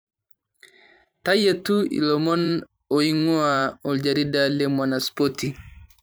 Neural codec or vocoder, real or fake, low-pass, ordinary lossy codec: vocoder, 44.1 kHz, 128 mel bands every 512 samples, BigVGAN v2; fake; none; none